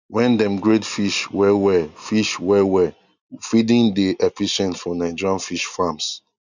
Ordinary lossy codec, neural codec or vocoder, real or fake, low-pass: none; none; real; 7.2 kHz